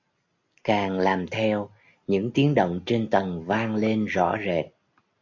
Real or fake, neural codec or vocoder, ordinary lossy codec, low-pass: real; none; AAC, 48 kbps; 7.2 kHz